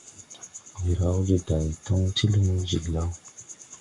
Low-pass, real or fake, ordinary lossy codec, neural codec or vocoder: 10.8 kHz; fake; MP3, 64 kbps; codec, 44.1 kHz, 7.8 kbps, Pupu-Codec